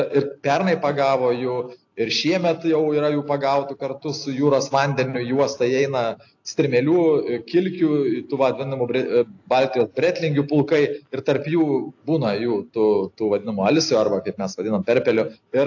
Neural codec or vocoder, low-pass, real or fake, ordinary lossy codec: none; 7.2 kHz; real; AAC, 48 kbps